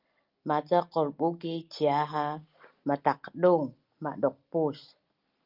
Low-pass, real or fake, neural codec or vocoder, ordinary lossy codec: 5.4 kHz; real; none; Opus, 24 kbps